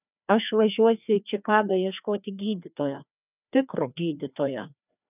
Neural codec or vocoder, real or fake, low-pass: codec, 16 kHz, 2 kbps, FreqCodec, larger model; fake; 3.6 kHz